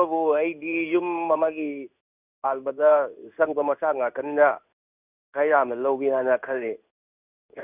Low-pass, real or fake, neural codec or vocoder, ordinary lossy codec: 3.6 kHz; fake; codec, 16 kHz in and 24 kHz out, 1 kbps, XY-Tokenizer; none